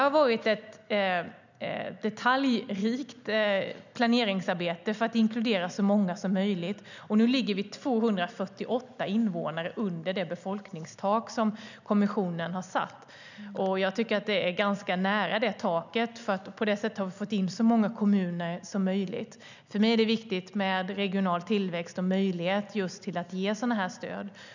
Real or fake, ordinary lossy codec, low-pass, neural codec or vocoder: real; none; 7.2 kHz; none